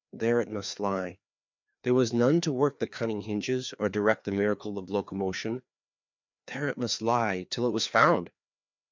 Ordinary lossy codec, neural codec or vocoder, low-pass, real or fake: MP3, 64 kbps; codec, 16 kHz, 2 kbps, FreqCodec, larger model; 7.2 kHz; fake